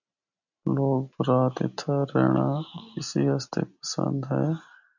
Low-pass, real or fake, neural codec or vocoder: 7.2 kHz; real; none